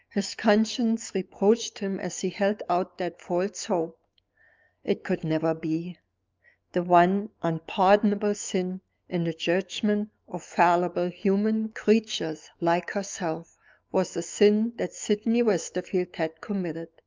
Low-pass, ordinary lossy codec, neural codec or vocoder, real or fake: 7.2 kHz; Opus, 24 kbps; autoencoder, 48 kHz, 128 numbers a frame, DAC-VAE, trained on Japanese speech; fake